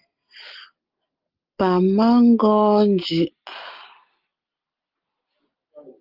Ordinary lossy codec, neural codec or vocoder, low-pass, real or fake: Opus, 32 kbps; none; 5.4 kHz; real